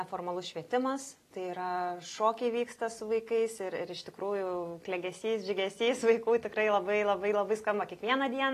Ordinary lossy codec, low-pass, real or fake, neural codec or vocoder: AAC, 48 kbps; 14.4 kHz; real; none